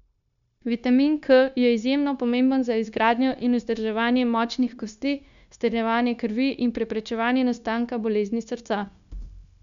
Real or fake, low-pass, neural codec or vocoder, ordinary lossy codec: fake; 7.2 kHz; codec, 16 kHz, 0.9 kbps, LongCat-Audio-Codec; none